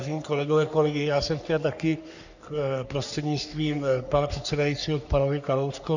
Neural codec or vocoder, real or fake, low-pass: codec, 44.1 kHz, 3.4 kbps, Pupu-Codec; fake; 7.2 kHz